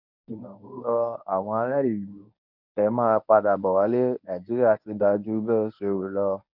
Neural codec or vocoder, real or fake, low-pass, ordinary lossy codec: codec, 24 kHz, 0.9 kbps, WavTokenizer, medium speech release version 1; fake; 5.4 kHz; none